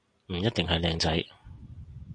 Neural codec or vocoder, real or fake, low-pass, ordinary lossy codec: none; real; 9.9 kHz; AAC, 48 kbps